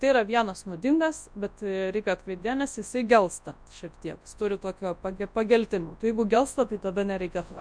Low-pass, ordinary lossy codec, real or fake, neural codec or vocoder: 9.9 kHz; MP3, 64 kbps; fake; codec, 24 kHz, 0.9 kbps, WavTokenizer, large speech release